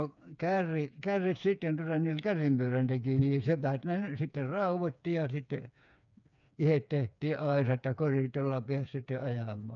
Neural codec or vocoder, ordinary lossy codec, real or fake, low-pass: codec, 16 kHz, 4 kbps, FreqCodec, smaller model; none; fake; 7.2 kHz